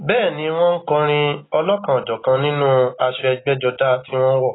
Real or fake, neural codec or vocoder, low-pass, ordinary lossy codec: real; none; 7.2 kHz; AAC, 16 kbps